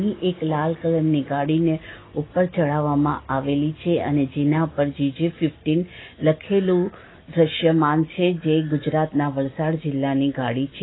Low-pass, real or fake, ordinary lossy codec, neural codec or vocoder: 7.2 kHz; real; AAC, 16 kbps; none